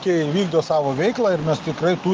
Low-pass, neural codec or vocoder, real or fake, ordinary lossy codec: 7.2 kHz; none; real; Opus, 24 kbps